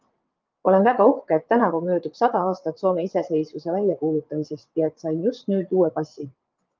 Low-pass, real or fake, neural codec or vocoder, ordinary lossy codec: 7.2 kHz; fake; vocoder, 22.05 kHz, 80 mel bands, WaveNeXt; Opus, 24 kbps